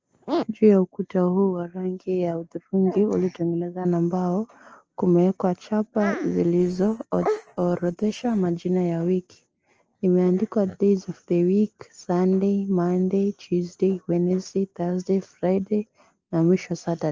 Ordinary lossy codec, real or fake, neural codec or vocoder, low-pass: Opus, 24 kbps; real; none; 7.2 kHz